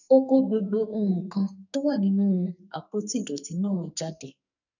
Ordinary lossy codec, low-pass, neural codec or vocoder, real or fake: none; 7.2 kHz; codec, 44.1 kHz, 2.6 kbps, SNAC; fake